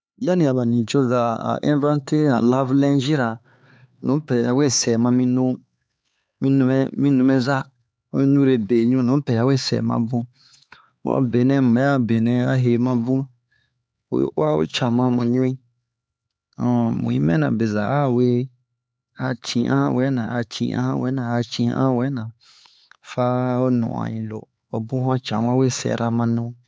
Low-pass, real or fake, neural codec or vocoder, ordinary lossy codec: none; fake; codec, 16 kHz, 4 kbps, X-Codec, HuBERT features, trained on LibriSpeech; none